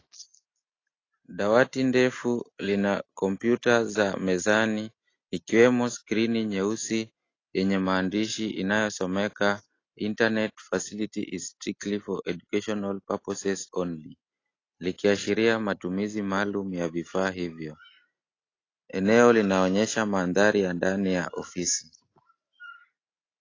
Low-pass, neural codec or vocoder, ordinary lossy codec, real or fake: 7.2 kHz; none; AAC, 32 kbps; real